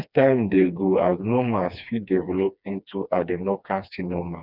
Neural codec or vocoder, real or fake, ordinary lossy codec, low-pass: codec, 16 kHz, 2 kbps, FreqCodec, smaller model; fake; none; 5.4 kHz